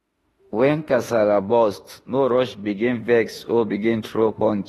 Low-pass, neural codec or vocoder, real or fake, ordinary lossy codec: 19.8 kHz; autoencoder, 48 kHz, 32 numbers a frame, DAC-VAE, trained on Japanese speech; fake; AAC, 32 kbps